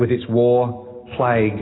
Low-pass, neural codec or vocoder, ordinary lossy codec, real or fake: 7.2 kHz; none; AAC, 16 kbps; real